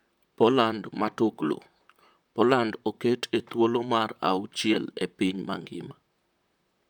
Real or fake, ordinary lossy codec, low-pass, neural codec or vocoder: fake; none; 19.8 kHz; vocoder, 44.1 kHz, 128 mel bands, Pupu-Vocoder